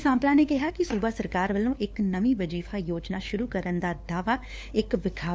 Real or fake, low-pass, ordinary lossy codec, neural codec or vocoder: fake; none; none; codec, 16 kHz, 4 kbps, FunCodec, trained on LibriTTS, 50 frames a second